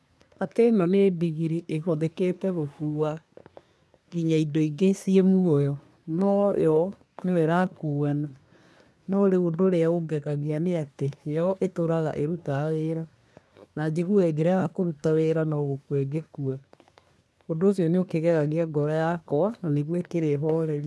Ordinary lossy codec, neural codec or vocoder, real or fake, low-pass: none; codec, 24 kHz, 1 kbps, SNAC; fake; none